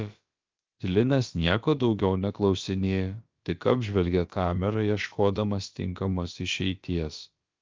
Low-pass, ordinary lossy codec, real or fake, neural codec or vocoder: 7.2 kHz; Opus, 32 kbps; fake; codec, 16 kHz, about 1 kbps, DyCAST, with the encoder's durations